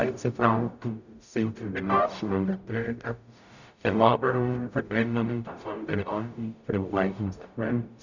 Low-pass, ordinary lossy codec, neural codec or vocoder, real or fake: 7.2 kHz; none; codec, 44.1 kHz, 0.9 kbps, DAC; fake